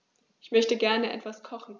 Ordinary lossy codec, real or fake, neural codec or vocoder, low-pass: none; real; none; none